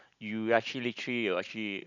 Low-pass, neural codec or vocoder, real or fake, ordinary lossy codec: 7.2 kHz; none; real; none